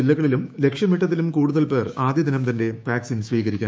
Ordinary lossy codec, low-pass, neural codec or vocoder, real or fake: none; none; codec, 16 kHz, 6 kbps, DAC; fake